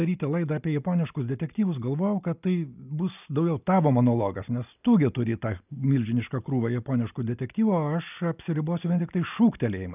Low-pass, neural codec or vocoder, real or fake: 3.6 kHz; none; real